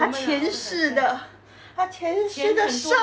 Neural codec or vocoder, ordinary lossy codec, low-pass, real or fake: none; none; none; real